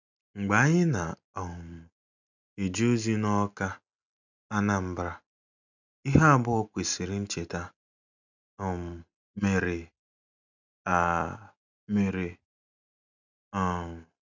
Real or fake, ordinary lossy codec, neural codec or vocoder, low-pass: real; none; none; 7.2 kHz